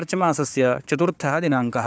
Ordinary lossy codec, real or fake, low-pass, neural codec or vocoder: none; fake; none; codec, 16 kHz, 4 kbps, FunCodec, trained on Chinese and English, 50 frames a second